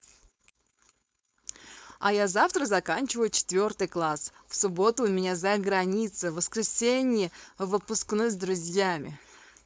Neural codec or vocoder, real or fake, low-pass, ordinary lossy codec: codec, 16 kHz, 4.8 kbps, FACodec; fake; none; none